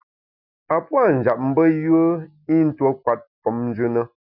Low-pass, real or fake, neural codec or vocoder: 5.4 kHz; real; none